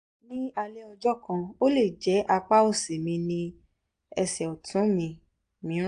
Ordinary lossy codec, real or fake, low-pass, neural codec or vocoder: AAC, 48 kbps; real; 9.9 kHz; none